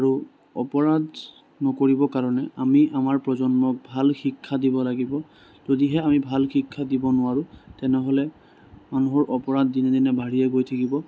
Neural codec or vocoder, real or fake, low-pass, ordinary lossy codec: none; real; none; none